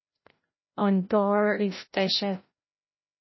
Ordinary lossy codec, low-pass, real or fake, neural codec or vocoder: MP3, 24 kbps; 7.2 kHz; fake; codec, 16 kHz, 0.5 kbps, FreqCodec, larger model